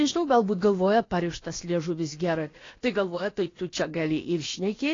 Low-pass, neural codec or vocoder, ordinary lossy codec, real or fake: 7.2 kHz; codec, 16 kHz, about 1 kbps, DyCAST, with the encoder's durations; AAC, 32 kbps; fake